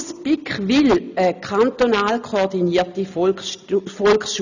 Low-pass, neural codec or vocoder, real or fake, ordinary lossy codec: 7.2 kHz; none; real; none